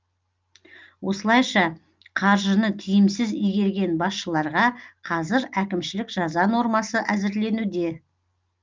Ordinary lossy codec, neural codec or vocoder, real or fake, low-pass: Opus, 24 kbps; none; real; 7.2 kHz